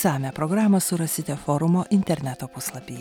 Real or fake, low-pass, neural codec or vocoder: real; 19.8 kHz; none